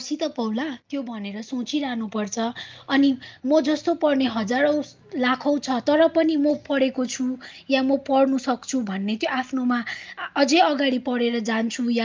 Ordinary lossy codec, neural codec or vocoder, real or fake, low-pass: Opus, 24 kbps; none; real; 7.2 kHz